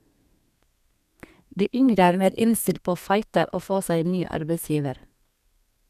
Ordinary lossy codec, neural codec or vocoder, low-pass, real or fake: none; codec, 32 kHz, 1.9 kbps, SNAC; 14.4 kHz; fake